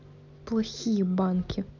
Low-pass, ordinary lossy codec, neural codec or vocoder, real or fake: 7.2 kHz; none; none; real